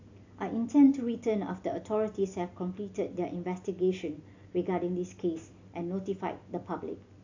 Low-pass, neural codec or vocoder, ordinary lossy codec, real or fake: 7.2 kHz; none; AAC, 48 kbps; real